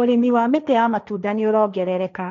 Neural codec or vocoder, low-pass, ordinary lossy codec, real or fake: codec, 16 kHz, 1.1 kbps, Voila-Tokenizer; 7.2 kHz; none; fake